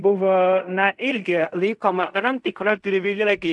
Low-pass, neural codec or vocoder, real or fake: 10.8 kHz; codec, 16 kHz in and 24 kHz out, 0.4 kbps, LongCat-Audio-Codec, fine tuned four codebook decoder; fake